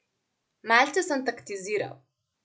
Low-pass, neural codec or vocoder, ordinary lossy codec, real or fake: none; none; none; real